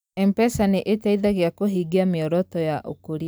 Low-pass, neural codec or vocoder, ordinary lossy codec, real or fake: none; none; none; real